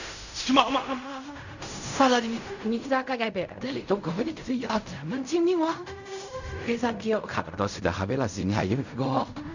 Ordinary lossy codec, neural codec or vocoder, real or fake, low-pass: none; codec, 16 kHz in and 24 kHz out, 0.4 kbps, LongCat-Audio-Codec, fine tuned four codebook decoder; fake; 7.2 kHz